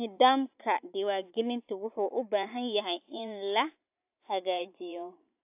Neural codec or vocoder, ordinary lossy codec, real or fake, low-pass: vocoder, 44.1 kHz, 128 mel bands every 512 samples, BigVGAN v2; AAC, 32 kbps; fake; 3.6 kHz